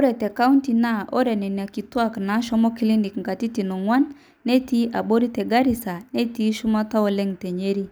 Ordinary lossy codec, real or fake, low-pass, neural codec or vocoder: none; real; none; none